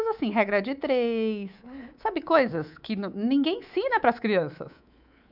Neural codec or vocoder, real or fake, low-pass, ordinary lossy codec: codec, 24 kHz, 3.1 kbps, DualCodec; fake; 5.4 kHz; none